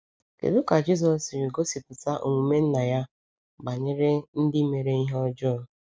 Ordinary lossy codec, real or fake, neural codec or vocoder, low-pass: none; real; none; none